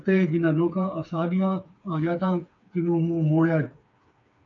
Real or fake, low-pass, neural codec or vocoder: fake; 7.2 kHz; codec, 16 kHz, 4 kbps, FreqCodec, smaller model